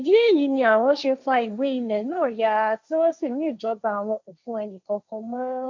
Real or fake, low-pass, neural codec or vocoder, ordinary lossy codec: fake; 7.2 kHz; codec, 16 kHz, 1.1 kbps, Voila-Tokenizer; MP3, 64 kbps